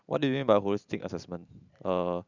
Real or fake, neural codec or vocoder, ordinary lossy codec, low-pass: fake; vocoder, 44.1 kHz, 128 mel bands every 512 samples, BigVGAN v2; none; 7.2 kHz